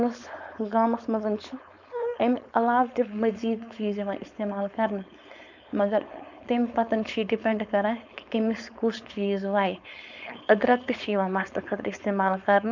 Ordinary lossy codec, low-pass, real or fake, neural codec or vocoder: none; 7.2 kHz; fake; codec, 16 kHz, 4.8 kbps, FACodec